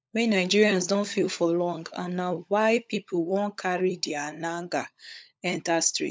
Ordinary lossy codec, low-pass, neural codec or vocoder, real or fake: none; none; codec, 16 kHz, 16 kbps, FunCodec, trained on LibriTTS, 50 frames a second; fake